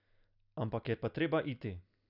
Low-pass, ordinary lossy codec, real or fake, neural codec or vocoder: 5.4 kHz; none; real; none